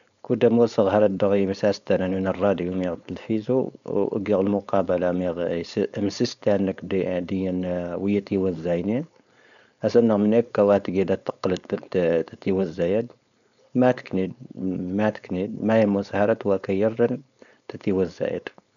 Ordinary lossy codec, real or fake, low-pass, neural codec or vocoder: none; fake; 7.2 kHz; codec, 16 kHz, 4.8 kbps, FACodec